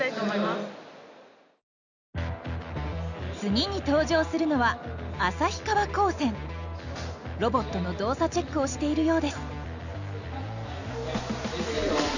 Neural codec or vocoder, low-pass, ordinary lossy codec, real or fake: none; 7.2 kHz; none; real